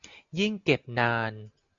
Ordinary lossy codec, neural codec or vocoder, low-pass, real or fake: AAC, 64 kbps; none; 7.2 kHz; real